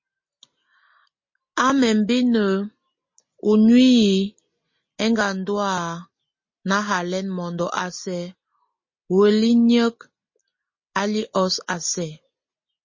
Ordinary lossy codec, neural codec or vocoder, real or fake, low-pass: MP3, 32 kbps; none; real; 7.2 kHz